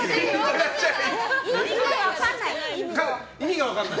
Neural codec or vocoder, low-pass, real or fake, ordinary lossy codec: none; none; real; none